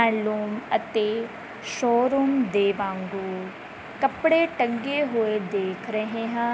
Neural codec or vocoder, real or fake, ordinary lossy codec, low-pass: none; real; none; none